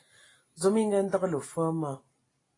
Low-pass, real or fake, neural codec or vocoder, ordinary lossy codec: 10.8 kHz; real; none; AAC, 32 kbps